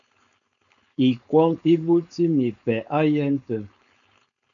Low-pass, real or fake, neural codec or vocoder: 7.2 kHz; fake; codec, 16 kHz, 4.8 kbps, FACodec